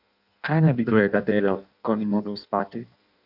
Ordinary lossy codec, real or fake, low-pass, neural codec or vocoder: none; fake; 5.4 kHz; codec, 16 kHz in and 24 kHz out, 0.6 kbps, FireRedTTS-2 codec